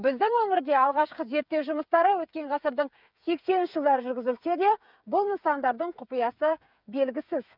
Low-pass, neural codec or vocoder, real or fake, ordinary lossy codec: 5.4 kHz; codec, 16 kHz, 8 kbps, FreqCodec, smaller model; fake; none